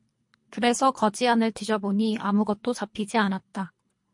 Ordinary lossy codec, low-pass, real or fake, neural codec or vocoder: MP3, 48 kbps; 10.8 kHz; fake; codec, 24 kHz, 3 kbps, HILCodec